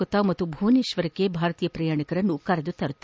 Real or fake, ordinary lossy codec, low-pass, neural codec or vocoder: real; none; none; none